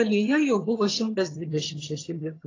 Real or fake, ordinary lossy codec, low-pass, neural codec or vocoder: fake; AAC, 32 kbps; 7.2 kHz; vocoder, 22.05 kHz, 80 mel bands, HiFi-GAN